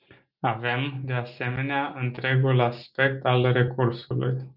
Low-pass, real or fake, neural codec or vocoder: 5.4 kHz; real; none